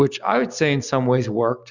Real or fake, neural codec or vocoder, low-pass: real; none; 7.2 kHz